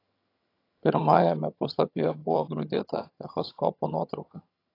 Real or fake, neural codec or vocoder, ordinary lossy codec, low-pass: fake; vocoder, 22.05 kHz, 80 mel bands, HiFi-GAN; AAC, 32 kbps; 5.4 kHz